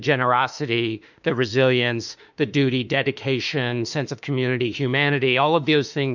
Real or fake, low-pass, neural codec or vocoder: fake; 7.2 kHz; autoencoder, 48 kHz, 32 numbers a frame, DAC-VAE, trained on Japanese speech